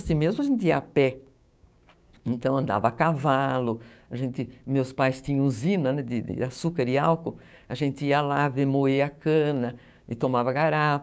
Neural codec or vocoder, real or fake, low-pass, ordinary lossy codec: codec, 16 kHz, 6 kbps, DAC; fake; none; none